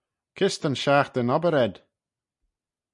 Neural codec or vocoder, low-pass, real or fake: none; 10.8 kHz; real